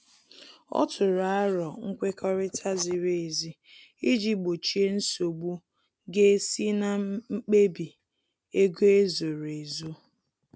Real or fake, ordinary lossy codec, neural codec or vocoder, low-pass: real; none; none; none